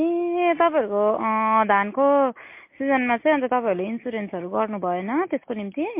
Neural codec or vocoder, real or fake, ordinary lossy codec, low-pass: none; real; MP3, 24 kbps; 3.6 kHz